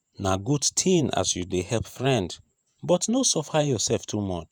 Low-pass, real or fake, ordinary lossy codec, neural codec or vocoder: none; fake; none; vocoder, 48 kHz, 128 mel bands, Vocos